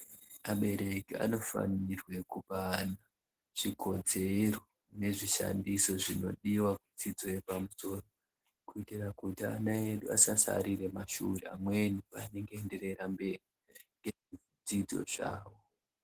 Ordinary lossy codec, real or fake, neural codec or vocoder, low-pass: Opus, 16 kbps; real; none; 14.4 kHz